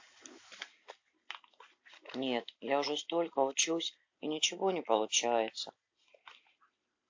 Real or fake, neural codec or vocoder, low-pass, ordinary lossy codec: real; none; 7.2 kHz; AAC, 48 kbps